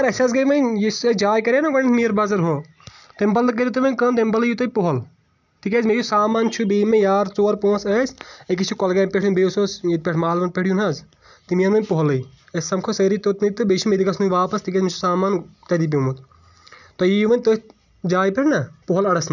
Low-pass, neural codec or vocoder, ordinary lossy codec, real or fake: 7.2 kHz; none; none; real